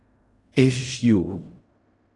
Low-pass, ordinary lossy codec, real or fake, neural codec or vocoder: 10.8 kHz; AAC, 48 kbps; fake; codec, 16 kHz in and 24 kHz out, 0.4 kbps, LongCat-Audio-Codec, fine tuned four codebook decoder